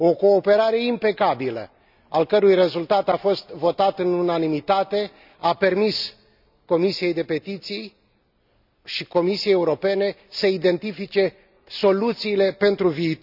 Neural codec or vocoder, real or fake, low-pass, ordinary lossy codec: none; real; 5.4 kHz; none